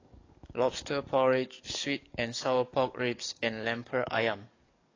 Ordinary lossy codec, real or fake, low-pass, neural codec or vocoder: AAC, 32 kbps; fake; 7.2 kHz; codec, 44.1 kHz, 7.8 kbps, DAC